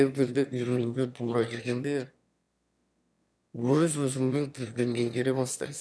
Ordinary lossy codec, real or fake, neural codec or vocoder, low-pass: none; fake; autoencoder, 22.05 kHz, a latent of 192 numbers a frame, VITS, trained on one speaker; none